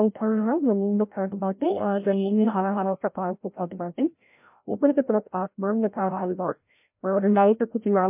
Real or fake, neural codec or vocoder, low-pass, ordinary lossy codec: fake; codec, 16 kHz, 0.5 kbps, FreqCodec, larger model; 3.6 kHz; MP3, 32 kbps